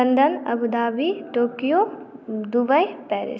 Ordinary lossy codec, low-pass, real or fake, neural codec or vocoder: none; none; real; none